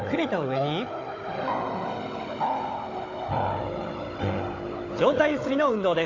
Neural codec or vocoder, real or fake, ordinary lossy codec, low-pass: codec, 16 kHz, 16 kbps, FunCodec, trained on Chinese and English, 50 frames a second; fake; AAC, 32 kbps; 7.2 kHz